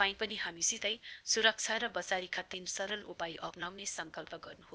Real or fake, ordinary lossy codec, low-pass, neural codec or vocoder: fake; none; none; codec, 16 kHz, 0.8 kbps, ZipCodec